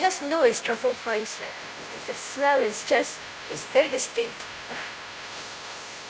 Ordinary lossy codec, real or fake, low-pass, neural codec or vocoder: none; fake; none; codec, 16 kHz, 0.5 kbps, FunCodec, trained on Chinese and English, 25 frames a second